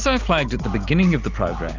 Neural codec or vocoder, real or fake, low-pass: none; real; 7.2 kHz